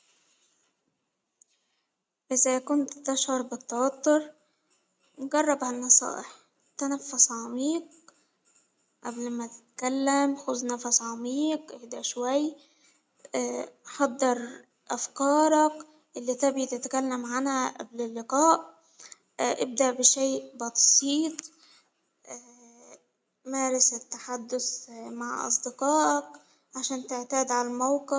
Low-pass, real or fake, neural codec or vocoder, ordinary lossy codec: none; real; none; none